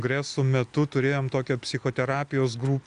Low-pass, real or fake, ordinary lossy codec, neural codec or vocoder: 9.9 kHz; real; Opus, 64 kbps; none